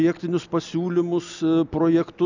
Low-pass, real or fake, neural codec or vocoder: 7.2 kHz; real; none